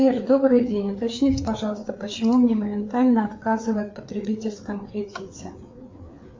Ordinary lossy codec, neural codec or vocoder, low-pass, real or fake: MP3, 48 kbps; codec, 16 kHz, 4 kbps, FreqCodec, larger model; 7.2 kHz; fake